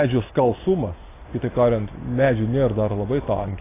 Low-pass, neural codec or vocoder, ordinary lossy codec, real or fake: 3.6 kHz; none; AAC, 16 kbps; real